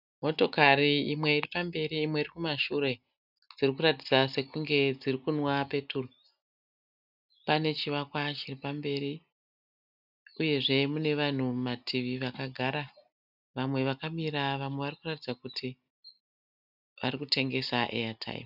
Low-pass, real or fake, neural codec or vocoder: 5.4 kHz; real; none